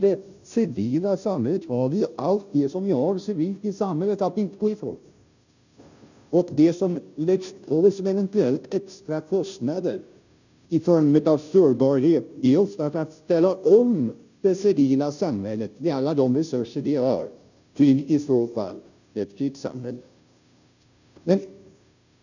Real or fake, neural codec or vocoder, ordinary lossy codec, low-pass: fake; codec, 16 kHz, 0.5 kbps, FunCodec, trained on Chinese and English, 25 frames a second; none; 7.2 kHz